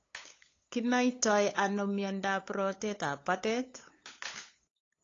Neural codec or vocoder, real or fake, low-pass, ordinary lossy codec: codec, 16 kHz, 8 kbps, FunCodec, trained on LibriTTS, 25 frames a second; fake; 7.2 kHz; AAC, 32 kbps